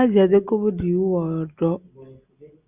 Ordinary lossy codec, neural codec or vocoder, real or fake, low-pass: Opus, 64 kbps; none; real; 3.6 kHz